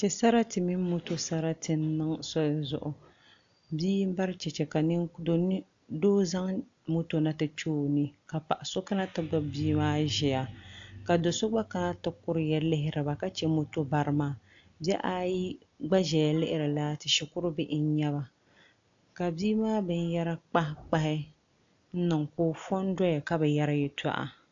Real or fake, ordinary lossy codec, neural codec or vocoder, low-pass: real; AAC, 64 kbps; none; 7.2 kHz